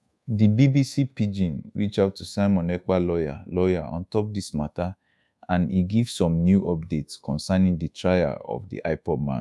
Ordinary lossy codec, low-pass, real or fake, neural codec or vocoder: none; none; fake; codec, 24 kHz, 1.2 kbps, DualCodec